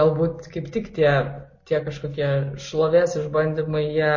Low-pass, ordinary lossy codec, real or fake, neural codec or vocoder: 7.2 kHz; MP3, 32 kbps; real; none